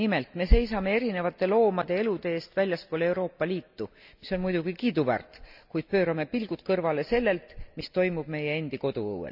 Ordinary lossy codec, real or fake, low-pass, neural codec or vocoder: none; real; 5.4 kHz; none